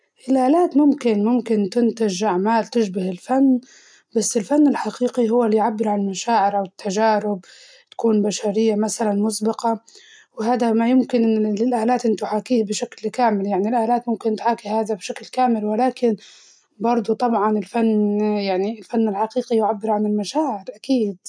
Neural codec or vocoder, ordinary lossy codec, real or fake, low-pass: none; none; real; 9.9 kHz